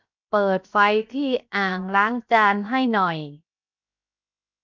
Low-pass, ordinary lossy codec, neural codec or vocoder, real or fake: 7.2 kHz; MP3, 64 kbps; codec, 16 kHz, 0.7 kbps, FocalCodec; fake